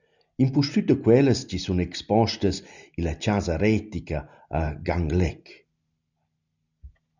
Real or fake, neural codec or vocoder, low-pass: real; none; 7.2 kHz